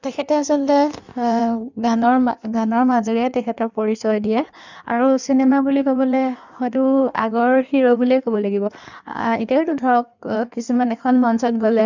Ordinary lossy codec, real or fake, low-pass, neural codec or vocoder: none; fake; 7.2 kHz; codec, 16 kHz in and 24 kHz out, 1.1 kbps, FireRedTTS-2 codec